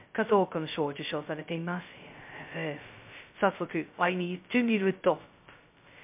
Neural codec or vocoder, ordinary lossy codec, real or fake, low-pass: codec, 16 kHz, 0.2 kbps, FocalCodec; MP3, 32 kbps; fake; 3.6 kHz